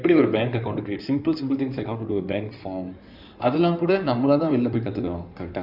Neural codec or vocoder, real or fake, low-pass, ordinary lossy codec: codec, 16 kHz in and 24 kHz out, 2.2 kbps, FireRedTTS-2 codec; fake; 5.4 kHz; none